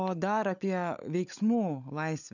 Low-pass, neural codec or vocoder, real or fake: 7.2 kHz; codec, 16 kHz, 16 kbps, FunCodec, trained on LibriTTS, 50 frames a second; fake